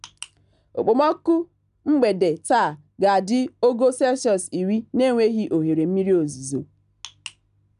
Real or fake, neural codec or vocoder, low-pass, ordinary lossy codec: real; none; 10.8 kHz; none